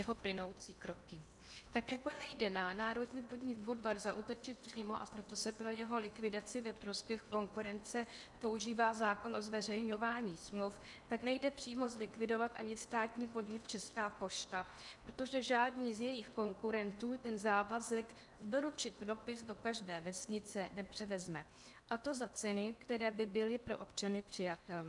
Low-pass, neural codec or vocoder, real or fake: 10.8 kHz; codec, 16 kHz in and 24 kHz out, 0.8 kbps, FocalCodec, streaming, 65536 codes; fake